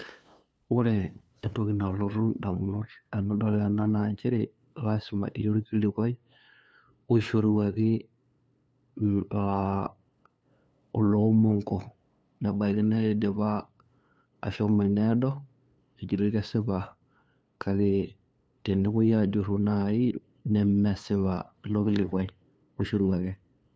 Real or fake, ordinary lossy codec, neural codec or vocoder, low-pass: fake; none; codec, 16 kHz, 2 kbps, FunCodec, trained on LibriTTS, 25 frames a second; none